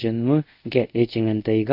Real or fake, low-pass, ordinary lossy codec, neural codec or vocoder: fake; 5.4 kHz; none; codec, 24 kHz, 0.5 kbps, DualCodec